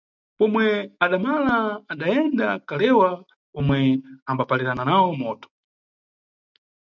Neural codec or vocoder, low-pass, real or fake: none; 7.2 kHz; real